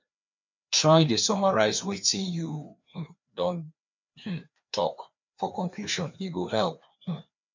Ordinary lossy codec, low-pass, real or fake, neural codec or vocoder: MP3, 64 kbps; 7.2 kHz; fake; codec, 16 kHz, 2 kbps, FreqCodec, larger model